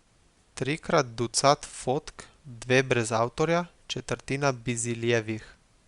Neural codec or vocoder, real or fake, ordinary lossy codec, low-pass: none; real; Opus, 64 kbps; 10.8 kHz